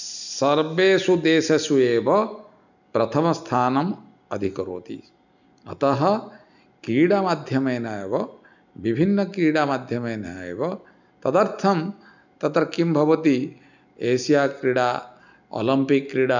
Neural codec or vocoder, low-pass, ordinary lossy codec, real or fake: none; 7.2 kHz; none; real